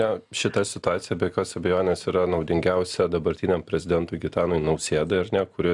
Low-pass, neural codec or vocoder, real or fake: 10.8 kHz; none; real